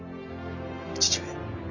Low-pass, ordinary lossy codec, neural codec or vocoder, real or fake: 7.2 kHz; none; none; real